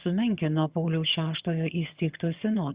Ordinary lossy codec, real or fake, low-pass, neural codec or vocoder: Opus, 64 kbps; fake; 3.6 kHz; vocoder, 22.05 kHz, 80 mel bands, HiFi-GAN